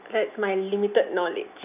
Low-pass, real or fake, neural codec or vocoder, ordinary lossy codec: 3.6 kHz; real; none; none